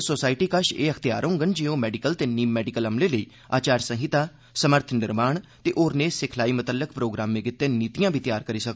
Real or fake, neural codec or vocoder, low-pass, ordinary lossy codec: real; none; none; none